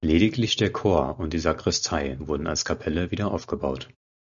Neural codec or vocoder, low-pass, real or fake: none; 7.2 kHz; real